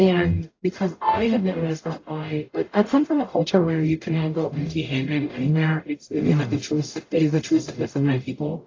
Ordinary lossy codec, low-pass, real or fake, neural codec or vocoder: AAC, 32 kbps; 7.2 kHz; fake; codec, 44.1 kHz, 0.9 kbps, DAC